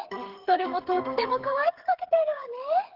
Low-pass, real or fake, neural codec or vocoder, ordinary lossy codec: 5.4 kHz; fake; codec, 16 kHz, 8 kbps, FreqCodec, smaller model; Opus, 16 kbps